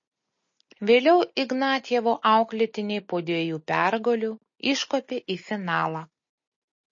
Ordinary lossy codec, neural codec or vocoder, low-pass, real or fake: MP3, 32 kbps; none; 7.2 kHz; real